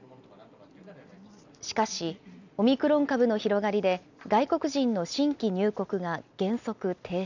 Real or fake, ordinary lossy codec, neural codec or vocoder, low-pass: real; none; none; 7.2 kHz